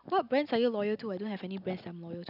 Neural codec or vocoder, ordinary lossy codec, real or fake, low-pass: none; none; real; 5.4 kHz